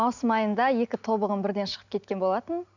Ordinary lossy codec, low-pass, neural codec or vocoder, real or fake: none; 7.2 kHz; none; real